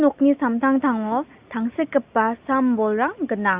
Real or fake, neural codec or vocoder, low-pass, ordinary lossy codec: real; none; 3.6 kHz; Opus, 64 kbps